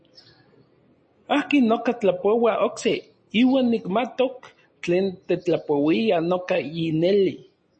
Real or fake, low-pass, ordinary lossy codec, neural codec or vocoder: real; 9.9 kHz; MP3, 32 kbps; none